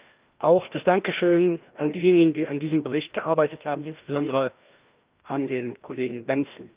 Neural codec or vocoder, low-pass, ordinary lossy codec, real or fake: codec, 16 kHz, 1 kbps, FreqCodec, larger model; 3.6 kHz; Opus, 24 kbps; fake